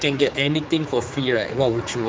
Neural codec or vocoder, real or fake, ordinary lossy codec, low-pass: codec, 16 kHz, 4 kbps, X-Codec, HuBERT features, trained on general audio; fake; none; none